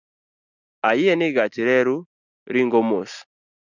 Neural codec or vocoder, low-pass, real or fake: none; 7.2 kHz; real